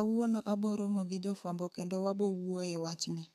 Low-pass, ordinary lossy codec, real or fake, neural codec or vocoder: 14.4 kHz; none; fake; codec, 32 kHz, 1.9 kbps, SNAC